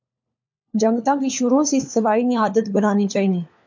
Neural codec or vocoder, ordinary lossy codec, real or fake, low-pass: codec, 16 kHz, 4 kbps, FunCodec, trained on LibriTTS, 50 frames a second; MP3, 64 kbps; fake; 7.2 kHz